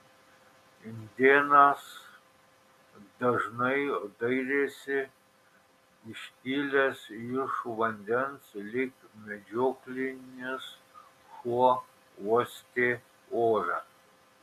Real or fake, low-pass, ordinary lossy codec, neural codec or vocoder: real; 14.4 kHz; MP3, 96 kbps; none